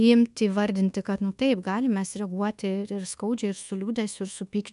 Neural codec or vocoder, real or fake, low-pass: codec, 24 kHz, 1.2 kbps, DualCodec; fake; 10.8 kHz